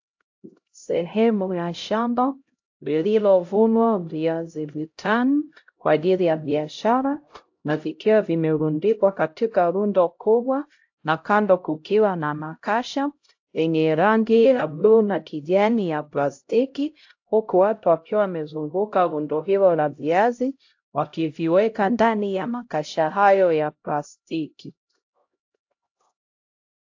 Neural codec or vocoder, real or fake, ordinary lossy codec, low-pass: codec, 16 kHz, 0.5 kbps, X-Codec, HuBERT features, trained on LibriSpeech; fake; AAC, 48 kbps; 7.2 kHz